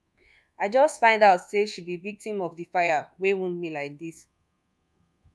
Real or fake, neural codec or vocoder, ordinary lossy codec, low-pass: fake; codec, 24 kHz, 1.2 kbps, DualCodec; none; none